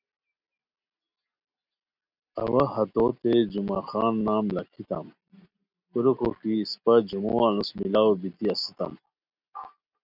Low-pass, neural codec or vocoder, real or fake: 5.4 kHz; none; real